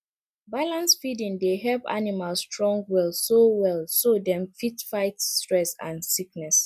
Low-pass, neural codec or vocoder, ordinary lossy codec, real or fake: 14.4 kHz; none; none; real